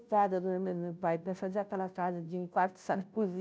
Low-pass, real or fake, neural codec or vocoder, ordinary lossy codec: none; fake; codec, 16 kHz, 0.5 kbps, FunCodec, trained on Chinese and English, 25 frames a second; none